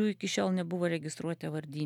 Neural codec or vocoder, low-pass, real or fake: none; 19.8 kHz; real